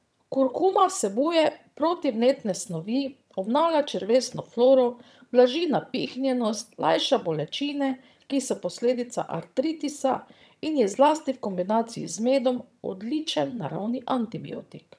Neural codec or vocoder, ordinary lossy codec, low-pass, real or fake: vocoder, 22.05 kHz, 80 mel bands, HiFi-GAN; none; none; fake